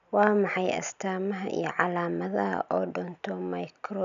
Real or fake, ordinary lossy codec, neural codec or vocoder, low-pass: real; none; none; 7.2 kHz